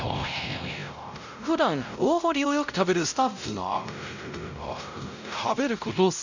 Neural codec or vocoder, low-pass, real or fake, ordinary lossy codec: codec, 16 kHz, 0.5 kbps, X-Codec, WavLM features, trained on Multilingual LibriSpeech; 7.2 kHz; fake; none